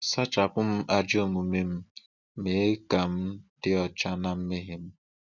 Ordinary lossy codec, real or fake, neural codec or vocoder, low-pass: none; real; none; 7.2 kHz